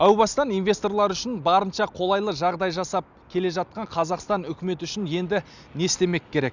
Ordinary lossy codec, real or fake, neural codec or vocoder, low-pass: none; real; none; 7.2 kHz